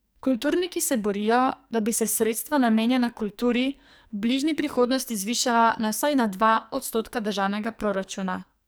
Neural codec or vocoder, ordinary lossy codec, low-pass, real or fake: codec, 44.1 kHz, 2.6 kbps, SNAC; none; none; fake